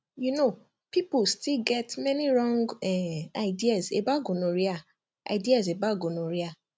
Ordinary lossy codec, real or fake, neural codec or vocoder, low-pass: none; real; none; none